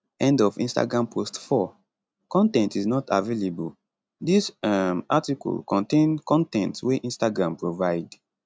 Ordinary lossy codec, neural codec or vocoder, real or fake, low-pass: none; none; real; none